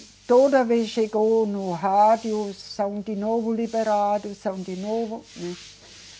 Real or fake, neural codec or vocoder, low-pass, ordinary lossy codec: real; none; none; none